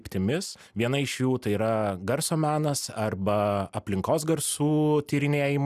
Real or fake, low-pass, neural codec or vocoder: real; 14.4 kHz; none